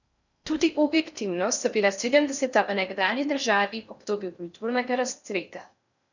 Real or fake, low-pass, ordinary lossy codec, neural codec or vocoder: fake; 7.2 kHz; none; codec, 16 kHz in and 24 kHz out, 0.6 kbps, FocalCodec, streaming, 4096 codes